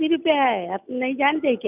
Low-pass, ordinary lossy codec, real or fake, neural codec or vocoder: 3.6 kHz; none; real; none